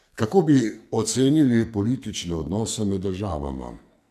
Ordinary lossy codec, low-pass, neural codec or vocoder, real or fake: none; 14.4 kHz; codec, 44.1 kHz, 2.6 kbps, SNAC; fake